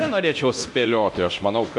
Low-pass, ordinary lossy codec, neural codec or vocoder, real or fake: 10.8 kHz; MP3, 64 kbps; codec, 24 kHz, 0.9 kbps, DualCodec; fake